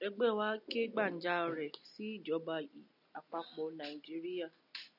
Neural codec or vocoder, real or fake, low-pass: none; real; 5.4 kHz